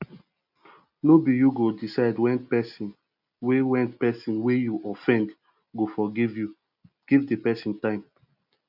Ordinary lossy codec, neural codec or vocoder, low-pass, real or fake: none; none; 5.4 kHz; real